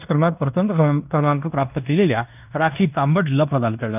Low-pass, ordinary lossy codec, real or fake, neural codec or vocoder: 3.6 kHz; none; fake; codec, 16 kHz in and 24 kHz out, 0.9 kbps, LongCat-Audio-Codec, fine tuned four codebook decoder